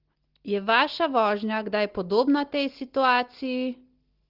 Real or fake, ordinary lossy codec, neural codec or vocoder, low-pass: real; Opus, 16 kbps; none; 5.4 kHz